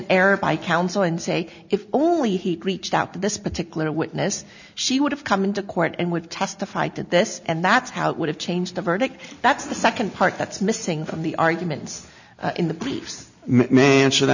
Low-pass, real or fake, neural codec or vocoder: 7.2 kHz; real; none